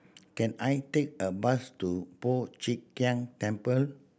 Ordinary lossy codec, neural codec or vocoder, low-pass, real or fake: none; none; none; real